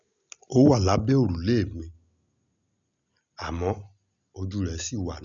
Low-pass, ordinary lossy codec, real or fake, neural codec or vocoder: 7.2 kHz; none; real; none